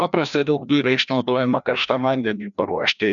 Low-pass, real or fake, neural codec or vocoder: 7.2 kHz; fake; codec, 16 kHz, 1 kbps, FreqCodec, larger model